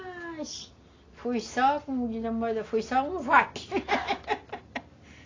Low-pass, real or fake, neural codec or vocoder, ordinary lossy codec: 7.2 kHz; real; none; AAC, 32 kbps